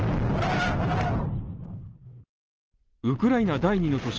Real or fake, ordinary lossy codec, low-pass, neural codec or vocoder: real; Opus, 16 kbps; 7.2 kHz; none